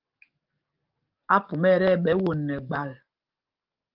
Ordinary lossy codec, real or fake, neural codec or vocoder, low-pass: Opus, 24 kbps; fake; codec, 44.1 kHz, 7.8 kbps, DAC; 5.4 kHz